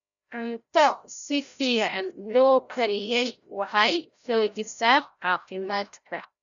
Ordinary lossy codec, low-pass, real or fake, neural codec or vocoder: none; 7.2 kHz; fake; codec, 16 kHz, 0.5 kbps, FreqCodec, larger model